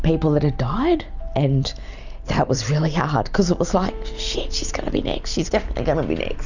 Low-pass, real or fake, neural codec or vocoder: 7.2 kHz; real; none